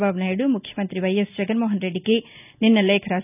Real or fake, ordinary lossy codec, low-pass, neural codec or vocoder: real; none; 3.6 kHz; none